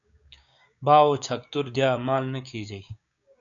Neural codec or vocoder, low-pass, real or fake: codec, 16 kHz, 6 kbps, DAC; 7.2 kHz; fake